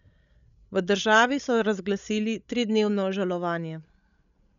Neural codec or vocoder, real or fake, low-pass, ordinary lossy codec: codec, 16 kHz, 16 kbps, FreqCodec, larger model; fake; 7.2 kHz; none